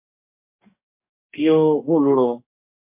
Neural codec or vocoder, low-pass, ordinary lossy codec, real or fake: codec, 44.1 kHz, 2.6 kbps, DAC; 3.6 kHz; MP3, 24 kbps; fake